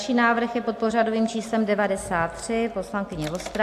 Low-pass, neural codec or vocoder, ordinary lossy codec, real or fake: 14.4 kHz; none; AAC, 64 kbps; real